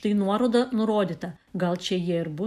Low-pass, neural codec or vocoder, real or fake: 14.4 kHz; none; real